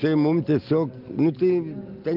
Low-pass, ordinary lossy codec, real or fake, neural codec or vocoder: 5.4 kHz; Opus, 24 kbps; real; none